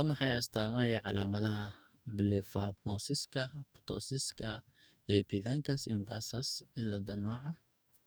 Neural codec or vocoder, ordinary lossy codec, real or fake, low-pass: codec, 44.1 kHz, 2.6 kbps, DAC; none; fake; none